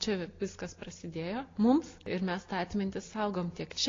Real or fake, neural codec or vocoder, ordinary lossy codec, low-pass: real; none; AAC, 32 kbps; 7.2 kHz